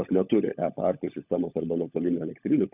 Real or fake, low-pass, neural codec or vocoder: fake; 3.6 kHz; codec, 16 kHz, 16 kbps, FunCodec, trained on LibriTTS, 50 frames a second